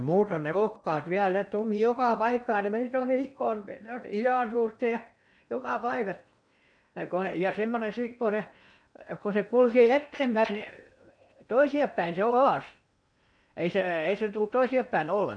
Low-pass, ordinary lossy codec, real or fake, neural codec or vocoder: 9.9 kHz; none; fake; codec, 16 kHz in and 24 kHz out, 0.8 kbps, FocalCodec, streaming, 65536 codes